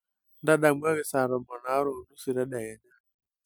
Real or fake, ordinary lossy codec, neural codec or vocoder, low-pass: real; none; none; none